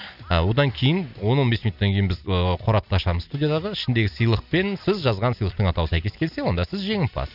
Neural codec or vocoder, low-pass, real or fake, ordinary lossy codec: none; 5.4 kHz; real; none